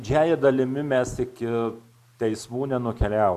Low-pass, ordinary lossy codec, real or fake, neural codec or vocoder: 14.4 kHz; Opus, 64 kbps; real; none